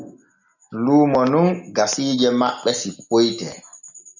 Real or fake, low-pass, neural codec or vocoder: real; 7.2 kHz; none